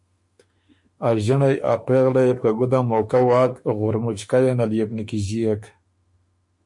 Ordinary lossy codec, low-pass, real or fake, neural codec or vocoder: MP3, 48 kbps; 10.8 kHz; fake; autoencoder, 48 kHz, 32 numbers a frame, DAC-VAE, trained on Japanese speech